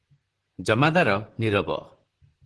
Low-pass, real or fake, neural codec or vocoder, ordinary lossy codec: 10.8 kHz; fake; vocoder, 44.1 kHz, 128 mel bands, Pupu-Vocoder; Opus, 16 kbps